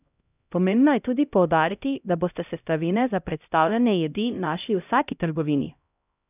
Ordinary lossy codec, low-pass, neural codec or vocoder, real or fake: none; 3.6 kHz; codec, 16 kHz, 0.5 kbps, X-Codec, HuBERT features, trained on LibriSpeech; fake